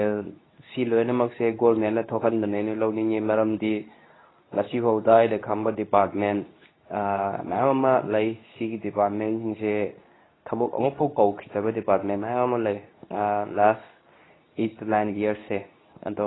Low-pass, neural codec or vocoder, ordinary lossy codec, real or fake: 7.2 kHz; codec, 24 kHz, 0.9 kbps, WavTokenizer, medium speech release version 2; AAC, 16 kbps; fake